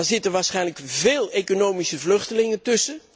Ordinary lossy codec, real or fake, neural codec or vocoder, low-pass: none; real; none; none